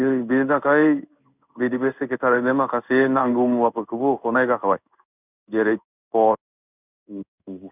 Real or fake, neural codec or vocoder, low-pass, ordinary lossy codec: fake; codec, 16 kHz in and 24 kHz out, 1 kbps, XY-Tokenizer; 3.6 kHz; none